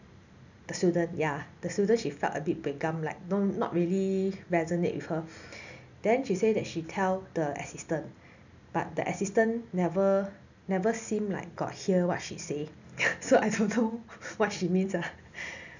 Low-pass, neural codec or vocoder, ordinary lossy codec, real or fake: 7.2 kHz; none; none; real